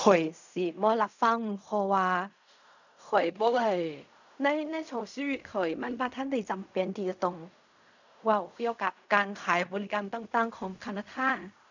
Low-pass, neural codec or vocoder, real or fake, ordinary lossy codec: 7.2 kHz; codec, 16 kHz in and 24 kHz out, 0.4 kbps, LongCat-Audio-Codec, fine tuned four codebook decoder; fake; none